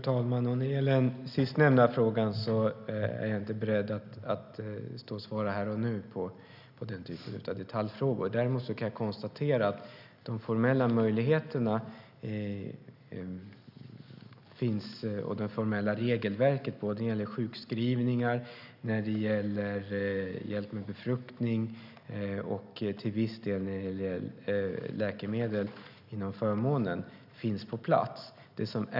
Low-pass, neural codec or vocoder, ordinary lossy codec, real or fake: 5.4 kHz; none; none; real